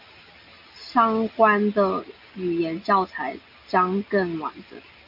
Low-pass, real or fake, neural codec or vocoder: 5.4 kHz; real; none